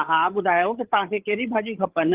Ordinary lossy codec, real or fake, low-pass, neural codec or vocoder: Opus, 16 kbps; fake; 3.6 kHz; codec, 16 kHz, 8 kbps, FreqCodec, larger model